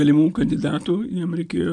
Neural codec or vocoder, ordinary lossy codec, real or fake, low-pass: none; AAC, 64 kbps; real; 10.8 kHz